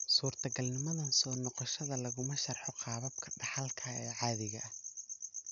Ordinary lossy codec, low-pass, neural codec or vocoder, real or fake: none; 7.2 kHz; none; real